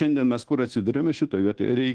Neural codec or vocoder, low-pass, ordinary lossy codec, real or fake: codec, 24 kHz, 1.2 kbps, DualCodec; 9.9 kHz; Opus, 16 kbps; fake